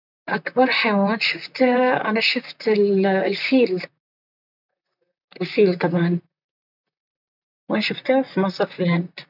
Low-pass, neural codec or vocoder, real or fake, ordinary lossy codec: 5.4 kHz; vocoder, 44.1 kHz, 128 mel bands, Pupu-Vocoder; fake; none